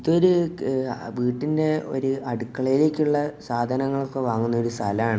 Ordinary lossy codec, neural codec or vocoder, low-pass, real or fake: none; none; none; real